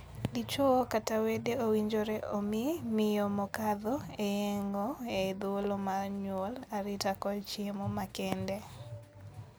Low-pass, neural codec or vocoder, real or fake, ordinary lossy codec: none; none; real; none